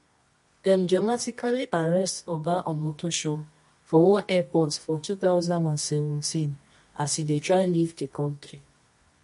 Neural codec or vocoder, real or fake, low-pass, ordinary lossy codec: codec, 24 kHz, 0.9 kbps, WavTokenizer, medium music audio release; fake; 10.8 kHz; MP3, 48 kbps